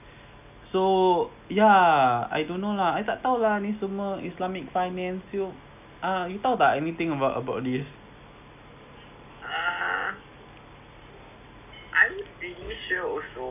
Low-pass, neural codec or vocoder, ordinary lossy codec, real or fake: 3.6 kHz; none; none; real